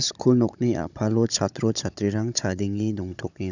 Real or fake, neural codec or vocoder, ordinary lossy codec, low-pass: fake; codec, 16 kHz, 16 kbps, FunCodec, trained on Chinese and English, 50 frames a second; none; 7.2 kHz